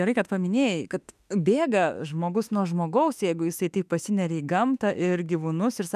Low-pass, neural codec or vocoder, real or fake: 14.4 kHz; autoencoder, 48 kHz, 32 numbers a frame, DAC-VAE, trained on Japanese speech; fake